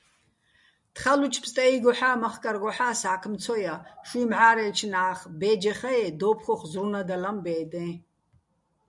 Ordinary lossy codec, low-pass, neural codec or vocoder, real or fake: MP3, 96 kbps; 10.8 kHz; none; real